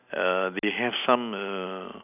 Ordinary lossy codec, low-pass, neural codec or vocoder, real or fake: none; 3.6 kHz; none; real